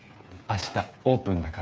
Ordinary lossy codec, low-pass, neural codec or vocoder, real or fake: none; none; codec, 16 kHz, 8 kbps, FreqCodec, smaller model; fake